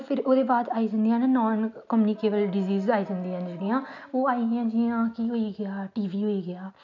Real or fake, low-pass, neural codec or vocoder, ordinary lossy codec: real; 7.2 kHz; none; none